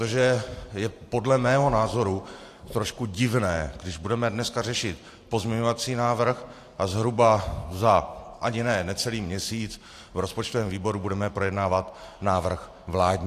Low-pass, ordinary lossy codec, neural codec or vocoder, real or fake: 14.4 kHz; AAC, 64 kbps; vocoder, 44.1 kHz, 128 mel bands every 512 samples, BigVGAN v2; fake